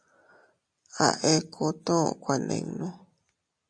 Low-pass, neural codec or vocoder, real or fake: 9.9 kHz; none; real